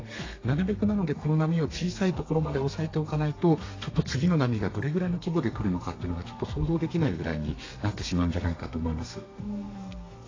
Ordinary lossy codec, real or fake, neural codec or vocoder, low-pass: AAC, 32 kbps; fake; codec, 32 kHz, 1.9 kbps, SNAC; 7.2 kHz